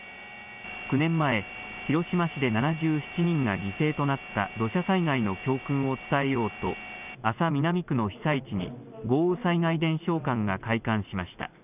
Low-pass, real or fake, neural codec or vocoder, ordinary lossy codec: 3.6 kHz; real; none; none